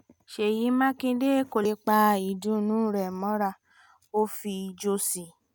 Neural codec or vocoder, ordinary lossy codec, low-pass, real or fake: none; none; none; real